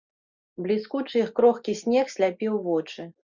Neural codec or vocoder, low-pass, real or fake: none; 7.2 kHz; real